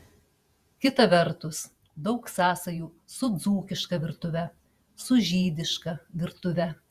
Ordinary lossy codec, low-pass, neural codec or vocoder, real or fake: Opus, 64 kbps; 14.4 kHz; vocoder, 48 kHz, 128 mel bands, Vocos; fake